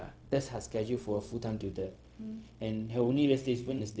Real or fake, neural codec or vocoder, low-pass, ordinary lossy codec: fake; codec, 16 kHz, 0.4 kbps, LongCat-Audio-Codec; none; none